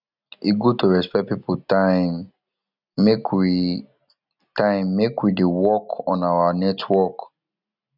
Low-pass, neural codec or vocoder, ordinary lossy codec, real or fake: 5.4 kHz; none; none; real